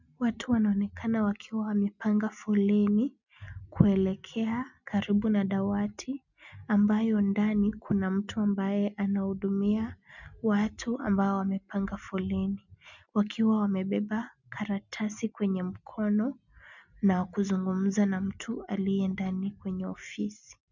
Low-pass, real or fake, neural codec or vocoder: 7.2 kHz; real; none